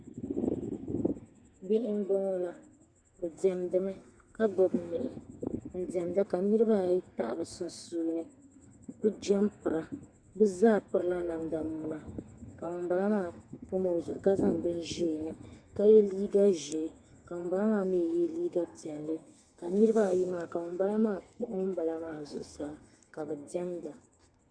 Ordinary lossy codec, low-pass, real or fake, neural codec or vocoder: Opus, 64 kbps; 9.9 kHz; fake; codec, 32 kHz, 1.9 kbps, SNAC